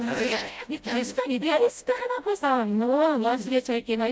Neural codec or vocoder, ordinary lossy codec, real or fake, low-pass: codec, 16 kHz, 0.5 kbps, FreqCodec, smaller model; none; fake; none